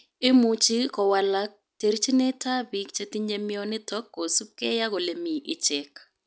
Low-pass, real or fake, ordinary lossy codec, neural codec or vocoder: none; real; none; none